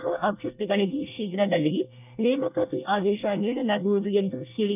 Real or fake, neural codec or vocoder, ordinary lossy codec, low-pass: fake; codec, 24 kHz, 1 kbps, SNAC; none; 3.6 kHz